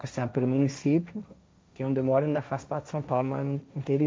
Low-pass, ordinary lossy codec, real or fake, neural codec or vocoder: none; none; fake; codec, 16 kHz, 1.1 kbps, Voila-Tokenizer